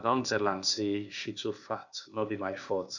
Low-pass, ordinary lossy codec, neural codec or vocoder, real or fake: 7.2 kHz; none; codec, 16 kHz, 0.8 kbps, ZipCodec; fake